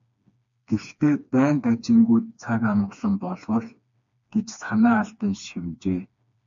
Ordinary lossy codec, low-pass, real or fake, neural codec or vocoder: MP3, 64 kbps; 7.2 kHz; fake; codec, 16 kHz, 2 kbps, FreqCodec, smaller model